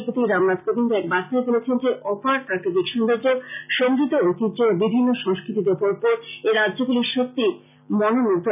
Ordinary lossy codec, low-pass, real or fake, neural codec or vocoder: none; 3.6 kHz; real; none